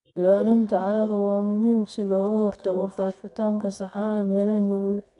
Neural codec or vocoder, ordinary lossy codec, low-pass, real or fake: codec, 24 kHz, 0.9 kbps, WavTokenizer, medium music audio release; none; 10.8 kHz; fake